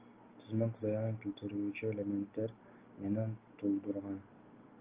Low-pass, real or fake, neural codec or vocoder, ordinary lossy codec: 3.6 kHz; real; none; Opus, 32 kbps